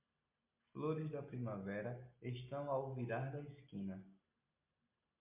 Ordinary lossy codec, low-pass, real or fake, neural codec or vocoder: MP3, 32 kbps; 3.6 kHz; real; none